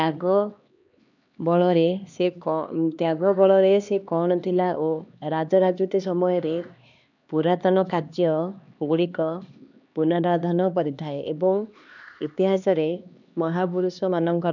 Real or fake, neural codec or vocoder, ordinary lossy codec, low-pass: fake; codec, 16 kHz, 2 kbps, X-Codec, HuBERT features, trained on LibriSpeech; none; 7.2 kHz